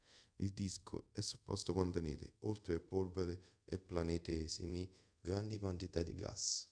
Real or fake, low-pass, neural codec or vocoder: fake; 9.9 kHz; codec, 24 kHz, 0.5 kbps, DualCodec